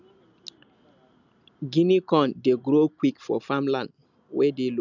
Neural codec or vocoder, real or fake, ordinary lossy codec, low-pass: none; real; none; 7.2 kHz